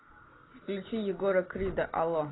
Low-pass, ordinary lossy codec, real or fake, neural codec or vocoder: 7.2 kHz; AAC, 16 kbps; real; none